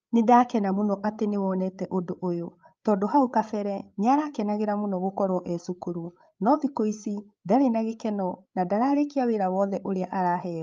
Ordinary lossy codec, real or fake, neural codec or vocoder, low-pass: Opus, 24 kbps; fake; codec, 16 kHz, 8 kbps, FreqCodec, larger model; 7.2 kHz